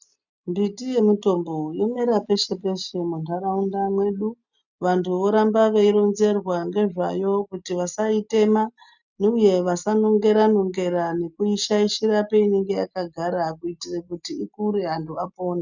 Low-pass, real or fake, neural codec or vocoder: 7.2 kHz; real; none